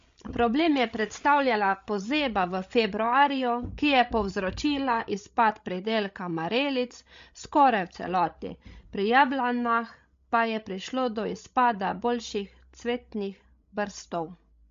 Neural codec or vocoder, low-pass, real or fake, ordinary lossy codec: codec, 16 kHz, 16 kbps, FreqCodec, larger model; 7.2 kHz; fake; MP3, 48 kbps